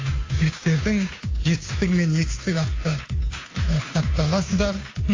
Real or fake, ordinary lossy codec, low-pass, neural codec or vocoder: fake; AAC, 32 kbps; 7.2 kHz; codec, 16 kHz, 2 kbps, FunCodec, trained on Chinese and English, 25 frames a second